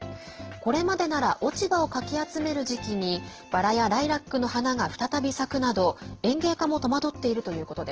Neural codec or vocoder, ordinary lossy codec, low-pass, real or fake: none; Opus, 16 kbps; 7.2 kHz; real